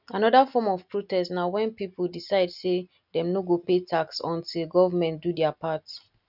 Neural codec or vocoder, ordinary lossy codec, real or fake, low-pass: none; none; real; 5.4 kHz